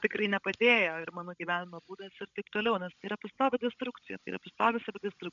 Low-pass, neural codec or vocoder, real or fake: 7.2 kHz; codec, 16 kHz, 16 kbps, FreqCodec, larger model; fake